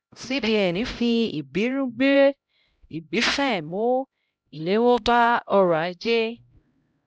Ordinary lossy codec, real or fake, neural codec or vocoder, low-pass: none; fake; codec, 16 kHz, 0.5 kbps, X-Codec, HuBERT features, trained on LibriSpeech; none